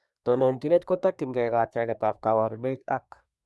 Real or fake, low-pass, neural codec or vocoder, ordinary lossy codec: fake; none; codec, 24 kHz, 1 kbps, SNAC; none